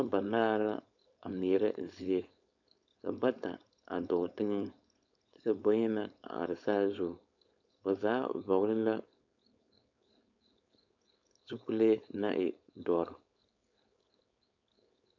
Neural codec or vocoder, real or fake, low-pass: codec, 16 kHz, 4.8 kbps, FACodec; fake; 7.2 kHz